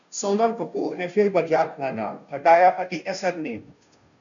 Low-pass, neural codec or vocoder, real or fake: 7.2 kHz; codec, 16 kHz, 0.5 kbps, FunCodec, trained on Chinese and English, 25 frames a second; fake